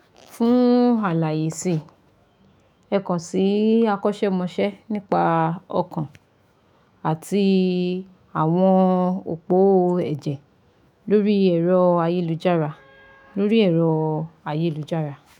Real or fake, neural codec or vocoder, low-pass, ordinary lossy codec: fake; autoencoder, 48 kHz, 128 numbers a frame, DAC-VAE, trained on Japanese speech; 19.8 kHz; none